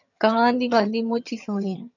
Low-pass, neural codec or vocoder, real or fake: 7.2 kHz; vocoder, 22.05 kHz, 80 mel bands, HiFi-GAN; fake